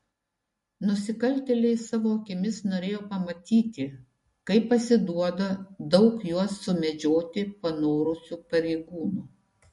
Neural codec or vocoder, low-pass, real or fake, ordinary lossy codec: none; 14.4 kHz; real; MP3, 48 kbps